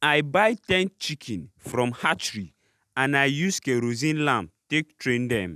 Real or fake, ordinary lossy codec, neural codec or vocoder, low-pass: real; none; none; 14.4 kHz